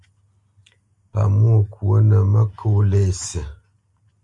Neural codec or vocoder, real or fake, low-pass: none; real; 10.8 kHz